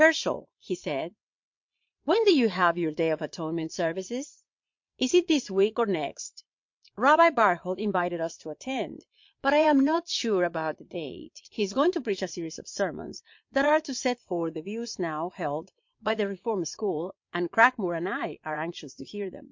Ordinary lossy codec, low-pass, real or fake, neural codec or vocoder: MP3, 48 kbps; 7.2 kHz; fake; vocoder, 22.05 kHz, 80 mel bands, Vocos